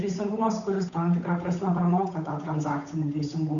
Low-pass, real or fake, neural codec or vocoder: 7.2 kHz; fake; codec, 16 kHz, 8 kbps, FunCodec, trained on Chinese and English, 25 frames a second